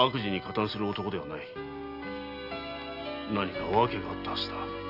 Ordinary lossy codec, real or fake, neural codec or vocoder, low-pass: none; real; none; 5.4 kHz